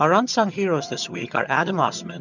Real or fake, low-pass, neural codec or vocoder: fake; 7.2 kHz; vocoder, 22.05 kHz, 80 mel bands, HiFi-GAN